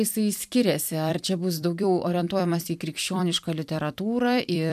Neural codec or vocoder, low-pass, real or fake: vocoder, 44.1 kHz, 128 mel bands every 256 samples, BigVGAN v2; 14.4 kHz; fake